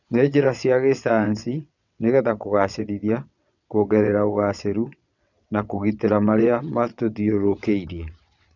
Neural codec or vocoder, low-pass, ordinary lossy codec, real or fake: vocoder, 22.05 kHz, 80 mel bands, WaveNeXt; 7.2 kHz; none; fake